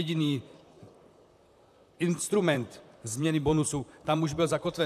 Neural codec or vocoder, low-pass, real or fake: vocoder, 44.1 kHz, 128 mel bands, Pupu-Vocoder; 14.4 kHz; fake